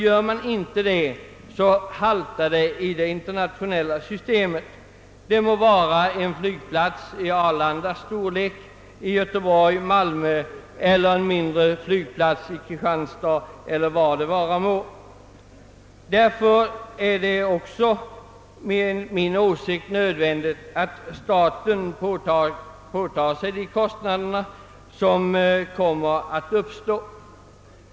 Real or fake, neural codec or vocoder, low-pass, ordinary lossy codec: real; none; none; none